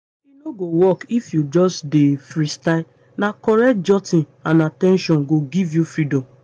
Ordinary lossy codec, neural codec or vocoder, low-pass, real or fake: AAC, 64 kbps; none; 9.9 kHz; real